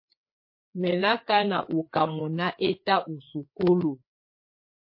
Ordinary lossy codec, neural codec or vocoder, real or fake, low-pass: MP3, 32 kbps; codec, 16 kHz, 4 kbps, FreqCodec, larger model; fake; 5.4 kHz